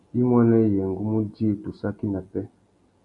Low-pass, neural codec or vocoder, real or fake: 10.8 kHz; none; real